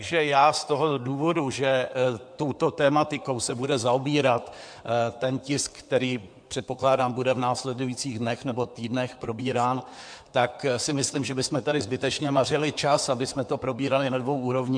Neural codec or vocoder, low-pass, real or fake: codec, 16 kHz in and 24 kHz out, 2.2 kbps, FireRedTTS-2 codec; 9.9 kHz; fake